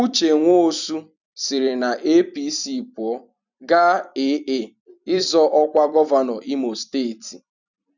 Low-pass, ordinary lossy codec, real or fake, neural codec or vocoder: 7.2 kHz; none; fake; vocoder, 44.1 kHz, 128 mel bands every 256 samples, BigVGAN v2